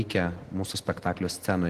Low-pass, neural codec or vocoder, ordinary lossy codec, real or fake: 14.4 kHz; none; Opus, 16 kbps; real